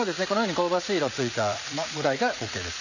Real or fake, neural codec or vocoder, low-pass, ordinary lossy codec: fake; vocoder, 44.1 kHz, 80 mel bands, Vocos; 7.2 kHz; none